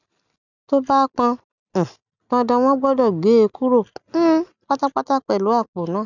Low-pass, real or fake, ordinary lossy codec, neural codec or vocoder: 7.2 kHz; real; none; none